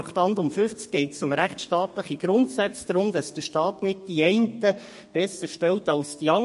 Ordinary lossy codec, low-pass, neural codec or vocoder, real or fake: MP3, 48 kbps; 14.4 kHz; codec, 32 kHz, 1.9 kbps, SNAC; fake